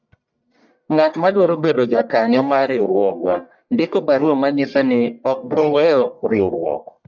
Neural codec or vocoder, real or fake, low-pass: codec, 44.1 kHz, 1.7 kbps, Pupu-Codec; fake; 7.2 kHz